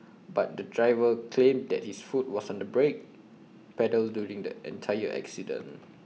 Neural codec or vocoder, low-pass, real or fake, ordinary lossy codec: none; none; real; none